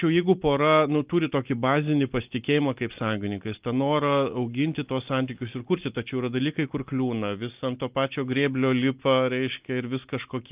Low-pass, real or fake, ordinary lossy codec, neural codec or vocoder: 3.6 kHz; real; Opus, 24 kbps; none